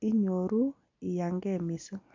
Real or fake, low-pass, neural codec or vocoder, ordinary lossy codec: real; 7.2 kHz; none; MP3, 64 kbps